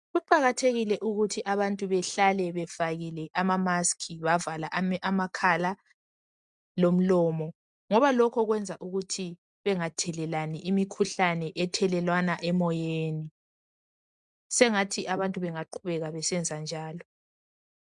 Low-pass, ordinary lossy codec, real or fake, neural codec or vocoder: 10.8 kHz; MP3, 96 kbps; real; none